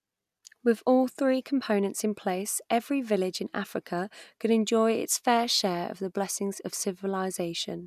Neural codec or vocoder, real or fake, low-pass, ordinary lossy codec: none; real; 14.4 kHz; none